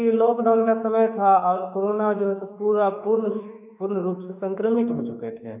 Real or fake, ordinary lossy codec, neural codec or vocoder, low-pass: fake; none; autoencoder, 48 kHz, 32 numbers a frame, DAC-VAE, trained on Japanese speech; 3.6 kHz